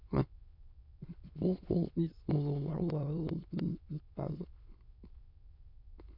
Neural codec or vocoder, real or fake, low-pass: autoencoder, 22.05 kHz, a latent of 192 numbers a frame, VITS, trained on many speakers; fake; 5.4 kHz